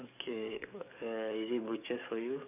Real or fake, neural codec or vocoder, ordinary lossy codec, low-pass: fake; codec, 44.1 kHz, 7.8 kbps, DAC; none; 3.6 kHz